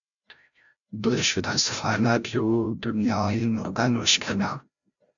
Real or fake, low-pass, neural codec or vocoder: fake; 7.2 kHz; codec, 16 kHz, 0.5 kbps, FreqCodec, larger model